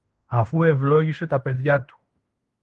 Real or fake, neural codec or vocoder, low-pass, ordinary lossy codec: fake; codec, 16 kHz in and 24 kHz out, 0.9 kbps, LongCat-Audio-Codec, fine tuned four codebook decoder; 10.8 kHz; Opus, 24 kbps